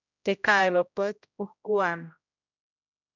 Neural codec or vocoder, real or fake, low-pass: codec, 16 kHz, 0.5 kbps, X-Codec, HuBERT features, trained on general audio; fake; 7.2 kHz